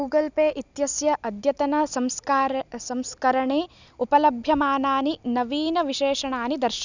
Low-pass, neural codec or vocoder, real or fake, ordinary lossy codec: 7.2 kHz; none; real; none